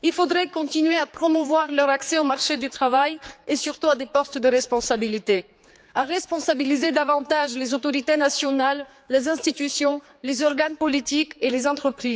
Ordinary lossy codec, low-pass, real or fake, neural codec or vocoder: none; none; fake; codec, 16 kHz, 4 kbps, X-Codec, HuBERT features, trained on general audio